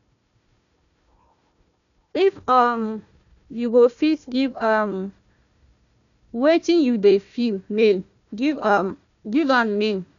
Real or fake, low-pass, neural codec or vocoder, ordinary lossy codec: fake; 7.2 kHz; codec, 16 kHz, 1 kbps, FunCodec, trained on Chinese and English, 50 frames a second; none